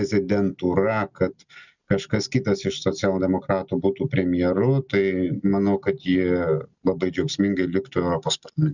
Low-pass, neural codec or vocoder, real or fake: 7.2 kHz; none; real